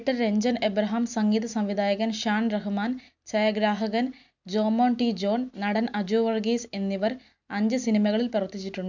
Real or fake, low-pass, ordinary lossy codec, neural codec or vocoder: real; 7.2 kHz; none; none